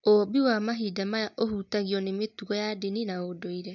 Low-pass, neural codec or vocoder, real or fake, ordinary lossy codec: 7.2 kHz; none; real; none